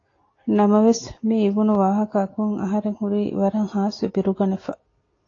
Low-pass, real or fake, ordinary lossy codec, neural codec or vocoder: 7.2 kHz; real; AAC, 32 kbps; none